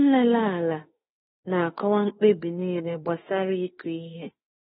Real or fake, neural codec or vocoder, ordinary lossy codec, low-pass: fake; autoencoder, 48 kHz, 32 numbers a frame, DAC-VAE, trained on Japanese speech; AAC, 16 kbps; 19.8 kHz